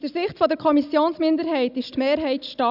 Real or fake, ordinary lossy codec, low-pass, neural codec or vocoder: real; none; 5.4 kHz; none